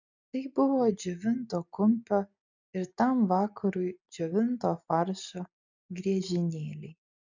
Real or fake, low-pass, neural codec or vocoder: real; 7.2 kHz; none